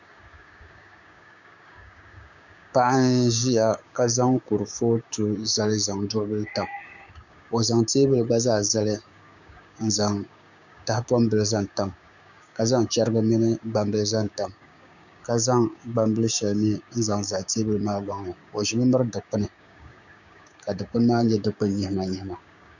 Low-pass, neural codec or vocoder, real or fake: 7.2 kHz; codec, 16 kHz, 6 kbps, DAC; fake